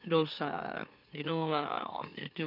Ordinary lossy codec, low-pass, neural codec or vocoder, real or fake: none; 5.4 kHz; autoencoder, 44.1 kHz, a latent of 192 numbers a frame, MeloTTS; fake